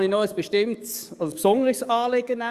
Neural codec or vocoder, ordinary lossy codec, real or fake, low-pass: codec, 44.1 kHz, 7.8 kbps, DAC; Opus, 64 kbps; fake; 14.4 kHz